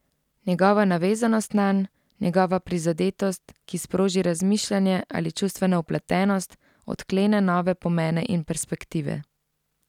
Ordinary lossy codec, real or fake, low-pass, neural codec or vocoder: none; real; 19.8 kHz; none